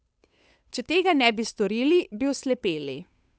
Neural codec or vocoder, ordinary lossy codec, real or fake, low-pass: codec, 16 kHz, 8 kbps, FunCodec, trained on Chinese and English, 25 frames a second; none; fake; none